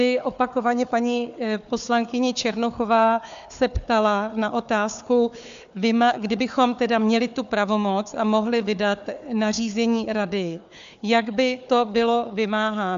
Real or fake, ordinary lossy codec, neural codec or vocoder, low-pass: fake; MP3, 64 kbps; codec, 16 kHz, 4 kbps, FunCodec, trained on Chinese and English, 50 frames a second; 7.2 kHz